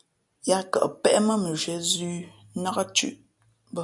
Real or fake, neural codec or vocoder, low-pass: real; none; 10.8 kHz